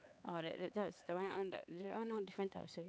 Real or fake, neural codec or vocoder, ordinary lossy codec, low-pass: fake; codec, 16 kHz, 4 kbps, X-Codec, HuBERT features, trained on LibriSpeech; none; none